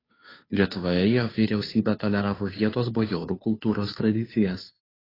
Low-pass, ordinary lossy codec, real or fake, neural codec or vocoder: 5.4 kHz; AAC, 24 kbps; fake; codec, 16 kHz, 2 kbps, FunCodec, trained on Chinese and English, 25 frames a second